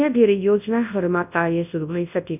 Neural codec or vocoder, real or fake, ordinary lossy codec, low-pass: codec, 24 kHz, 0.9 kbps, WavTokenizer, large speech release; fake; none; 3.6 kHz